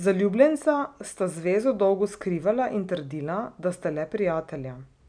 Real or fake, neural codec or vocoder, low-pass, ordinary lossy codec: real; none; 9.9 kHz; none